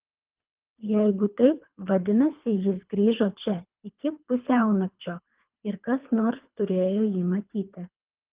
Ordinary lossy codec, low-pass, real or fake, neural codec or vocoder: Opus, 32 kbps; 3.6 kHz; fake; codec, 24 kHz, 3 kbps, HILCodec